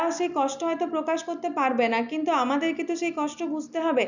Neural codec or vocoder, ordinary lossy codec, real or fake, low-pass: none; none; real; 7.2 kHz